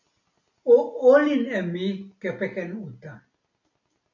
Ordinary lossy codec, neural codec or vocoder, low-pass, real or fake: MP3, 48 kbps; none; 7.2 kHz; real